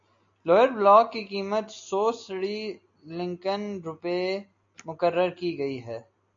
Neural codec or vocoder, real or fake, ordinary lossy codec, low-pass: none; real; AAC, 64 kbps; 7.2 kHz